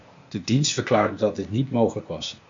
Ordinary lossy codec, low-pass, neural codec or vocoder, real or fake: MP3, 48 kbps; 7.2 kHz; codec, 16 kHz, 0.8 kbps, ZipCodec; fake